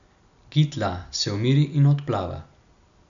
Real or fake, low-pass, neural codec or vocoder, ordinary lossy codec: real; 7.2 kHz; none; MP3, 96 kbps